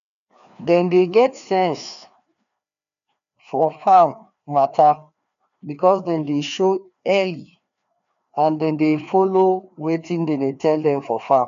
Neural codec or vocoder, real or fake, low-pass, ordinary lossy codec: codec, 16 kHz, 2 kbps, FreqCodec, larger model; fake; 7.2 kHz; none